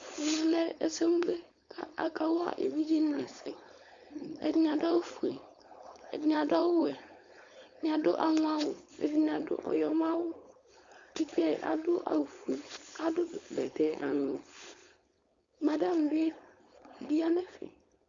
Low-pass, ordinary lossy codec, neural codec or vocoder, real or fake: 7.2 kHz; Opus, 64 kbps; codec, 16 kHz, 4.8 kbps, FACodec; fake